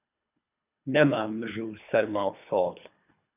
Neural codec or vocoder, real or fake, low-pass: codec, 24 kHz, 3 kbps, HILCodec; fake; 3.6 kHz